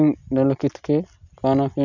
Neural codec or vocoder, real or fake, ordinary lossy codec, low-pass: none; real; none; 7.2 kHz